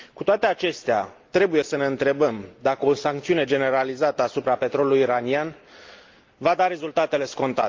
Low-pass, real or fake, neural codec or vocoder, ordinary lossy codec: 7.2 kHz; real; none; Opus, 24 kbps